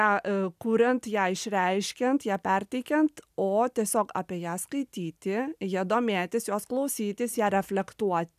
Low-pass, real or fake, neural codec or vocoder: 14.4 kHz; real; none